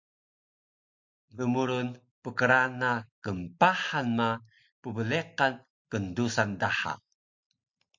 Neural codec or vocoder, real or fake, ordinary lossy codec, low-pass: none; real; MP3, 48 kbps; 7.2 kHz